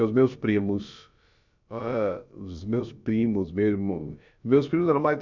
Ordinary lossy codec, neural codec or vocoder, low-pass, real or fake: none; codec, 16 kHz, about 1 kbps, DyCAST, with the encoder's durations; 7.2 kHz; fake